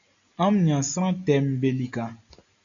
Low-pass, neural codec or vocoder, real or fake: 7.2 kHz; none; real